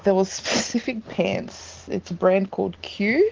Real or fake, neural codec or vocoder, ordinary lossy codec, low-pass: real; none; Opus, 16 kbps; 7.2 kHz